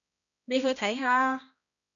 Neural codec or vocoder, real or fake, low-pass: codec, 16 kHz, 1 kbps, X-Codec, HuBERT features, trained on balanced general audio; fake; 7.2 kHz